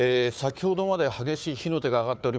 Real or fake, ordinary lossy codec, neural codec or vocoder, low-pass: fake; none; codec, 16 kHz, 4 kbps, FunCodec, trained on Chinese and English, 50 frames a second; none